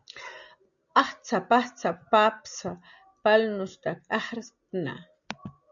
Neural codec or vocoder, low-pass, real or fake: none; 7.2 kHz; real